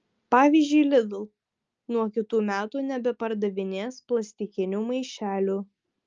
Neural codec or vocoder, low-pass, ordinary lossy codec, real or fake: none; 7.2 kHz; Opus, 24 kbps; real